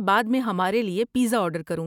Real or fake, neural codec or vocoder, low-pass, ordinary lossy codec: real; none; 19.8 kHz; none